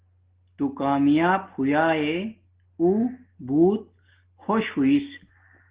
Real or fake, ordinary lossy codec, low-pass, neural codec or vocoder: real; Opus, 16 kbps; 3.6 kHz; none